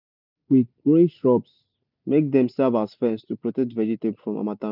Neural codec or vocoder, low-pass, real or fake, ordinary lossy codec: none; 5.4 kHz; real; none